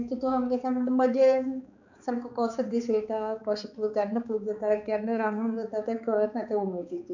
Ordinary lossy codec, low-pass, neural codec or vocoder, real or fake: none; 7.2 kHz; codec, 16 kHz, 4 kbps, X-Codec, HuBERT features, trained on balanced general audio; fake